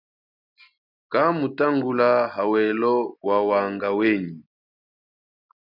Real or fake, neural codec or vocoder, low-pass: real; none; 5.4 kHz